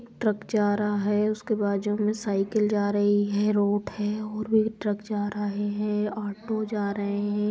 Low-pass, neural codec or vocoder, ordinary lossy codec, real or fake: none; none; none; real